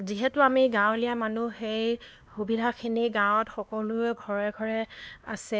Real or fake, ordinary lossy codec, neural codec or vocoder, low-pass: fake; none; codec, 16 kHz, 2 kbps, X-Codec, WavLM features, trained on Multilingual LibriSpeech; none